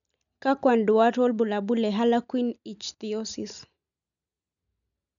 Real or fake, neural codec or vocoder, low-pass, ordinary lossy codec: real; none; 7.2 kHz; none